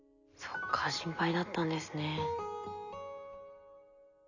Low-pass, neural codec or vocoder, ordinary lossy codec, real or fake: 7.2 kHz; none; none; real